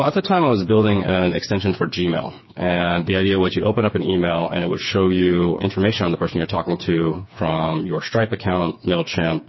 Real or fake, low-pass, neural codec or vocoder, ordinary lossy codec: fake; 7.2 kHz; codec, 16 kHz, 4 kbps, FreqCodec, smaller model; MP3, 24 kbps